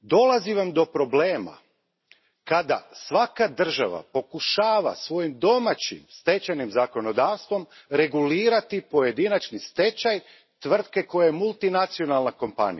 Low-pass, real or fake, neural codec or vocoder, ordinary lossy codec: 7.2 kHz; real; none; MP3, 24 kbps